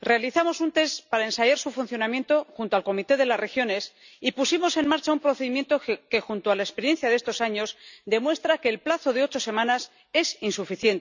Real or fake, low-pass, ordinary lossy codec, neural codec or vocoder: real; 7.2 kHz; none; none